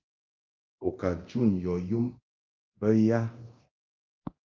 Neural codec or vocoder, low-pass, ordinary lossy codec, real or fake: codec, 24 kHz, 0.9 kbps, DualCodec; 7.2 kHz; Opus, 24 kbps; fake